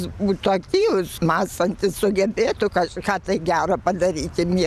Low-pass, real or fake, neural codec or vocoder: 14.4 kHz; fake; vocoder, 44.1 kHz, 128 mel bands every 512 samples, BigVGAN v2